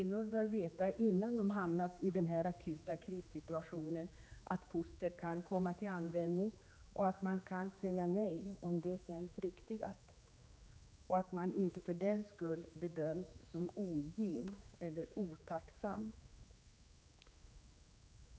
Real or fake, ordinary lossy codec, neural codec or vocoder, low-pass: fake; none; codec, 16 kHz, 2 kbps, X-Codec, HuBERT features, trained on general audio; none